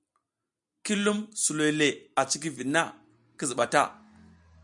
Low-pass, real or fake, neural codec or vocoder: 10.8 kHz; real; none